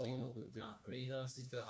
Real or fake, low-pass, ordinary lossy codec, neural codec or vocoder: fake; none; none; codec, 16 kHz, 1 kbps, FunCodec, trained on LibriTTS, 50 frames a second